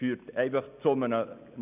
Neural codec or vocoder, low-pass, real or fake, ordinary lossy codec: none; 3.6 kHz; real; none